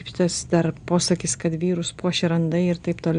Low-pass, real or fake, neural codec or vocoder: 9.9 kHz; real; none